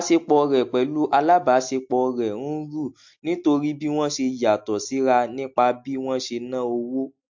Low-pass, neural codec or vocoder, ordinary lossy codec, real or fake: 7.2 kHz; none; MP3, 48 kbps; real